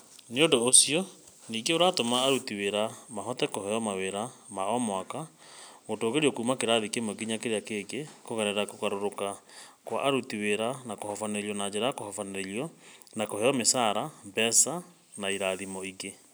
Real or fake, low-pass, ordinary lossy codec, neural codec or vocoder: real; none; none; none